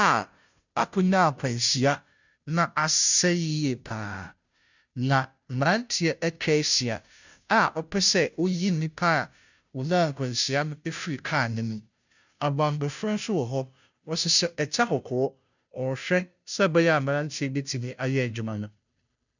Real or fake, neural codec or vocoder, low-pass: fake; codec, 16 kHz, 0.5 kbps, FunCodec, trained on Chinese and English, 25 frames a second; 7.2 kHz